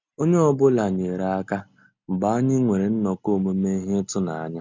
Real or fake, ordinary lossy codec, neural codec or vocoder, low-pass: real; MP3, 64 kbps; none; 7.2 kHz